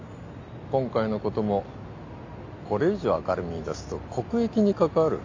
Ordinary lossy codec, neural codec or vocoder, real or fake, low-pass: AAC, 48 kbps; none; real; 7.2 kHz